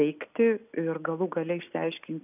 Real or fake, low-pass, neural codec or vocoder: real; 3.6 kHz; none